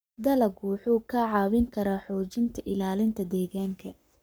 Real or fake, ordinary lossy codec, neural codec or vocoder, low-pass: fake; none; codec, 44.1 kHz, 7.8 kbps, Pupu-Codec; none